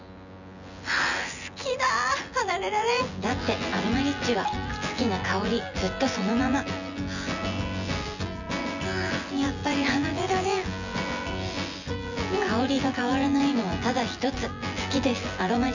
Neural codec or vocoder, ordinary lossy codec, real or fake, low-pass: vocoder, 24 kHz, 100 mel bands, Vocos; none; fake; 7.2 kHz